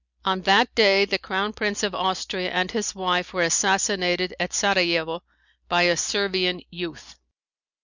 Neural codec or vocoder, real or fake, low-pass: none; real; 7.2 kHz